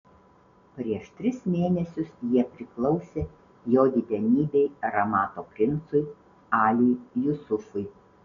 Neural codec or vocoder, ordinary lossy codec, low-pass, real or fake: none; MP3, 96 kbps; 7.2 kHz; real